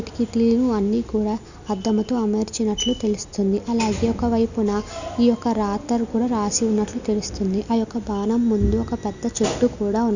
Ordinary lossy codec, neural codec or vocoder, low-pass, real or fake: none; none; 7.2 kHz; real